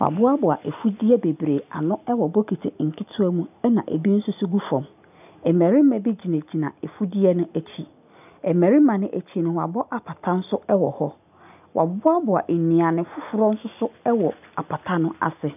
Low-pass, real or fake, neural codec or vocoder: 3.6 kHz; real; none